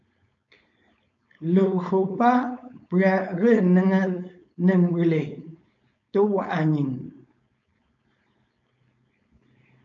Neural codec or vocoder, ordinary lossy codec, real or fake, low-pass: codec, 16 kHz, 4.8 kbps, FACodec; AAC, 64 kbps; fake; 7.2 kHz